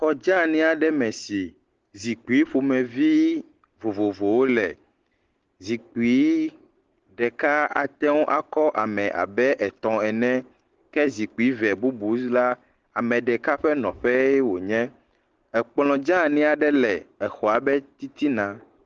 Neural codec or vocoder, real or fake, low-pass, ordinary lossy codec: none; real; 7.2 kHz; Opus, 16 kbps